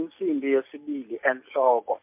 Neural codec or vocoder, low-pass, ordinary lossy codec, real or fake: none; 3.6 kHz; MP3, 32 kbps; real